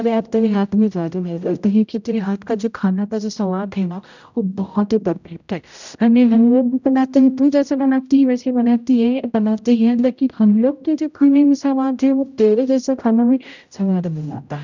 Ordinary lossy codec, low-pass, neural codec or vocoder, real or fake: none; 7.2 kHz; codec, 16 kHz, 0.5 kbps, X-Codec, HuBERT features, trained on general audio; fake